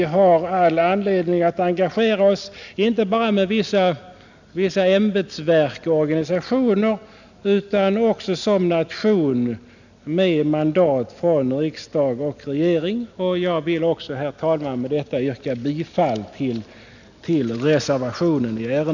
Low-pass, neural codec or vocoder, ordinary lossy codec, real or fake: 7.2 kHz; none; none; real